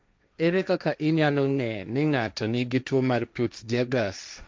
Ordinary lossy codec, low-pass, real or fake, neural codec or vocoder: none; none; fake; codec, 16 kHz, 1.1 kbps, Voila-Tokenizer